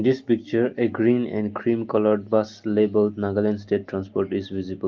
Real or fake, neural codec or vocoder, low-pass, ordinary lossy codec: real; none; 7.2 kHz; Opus, 32 kbps